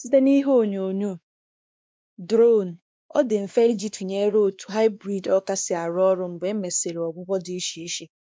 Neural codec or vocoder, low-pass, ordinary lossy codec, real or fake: codec, 16 kHz, 2 kbps, X-Codec, WavLM features, trained on Multilingual LibriSpeech; none; none; fake